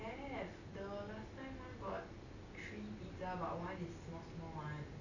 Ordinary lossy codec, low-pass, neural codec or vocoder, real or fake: none; 7.2 kHz; none; real